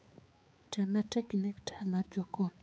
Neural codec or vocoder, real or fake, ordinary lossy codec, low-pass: codec, 16 kHz, 4 kbps, X-Codec, HuBERT features, trained on balanced general audio; fake; none; none